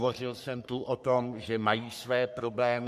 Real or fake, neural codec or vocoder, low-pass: fake; codec, 44.1 kHz, 3.4 kbps, Pupu-Codec; 14.4 kHz